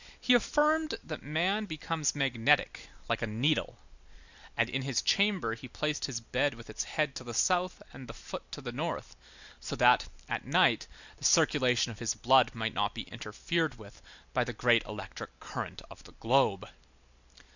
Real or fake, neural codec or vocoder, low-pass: real; none; 7.2 kHz